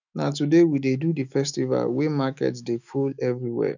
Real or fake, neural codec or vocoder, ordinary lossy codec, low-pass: fake; autoencoder, 48 kHz, 128 numbers a frame, DAC-VAE, trained on Japanese speech; none; 7.2 kHz